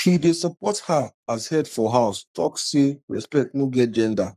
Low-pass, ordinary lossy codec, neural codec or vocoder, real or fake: 14.4 kHz; none; codec, 44.1 kHz, 3.4 kbps, Pupu-Codec; fake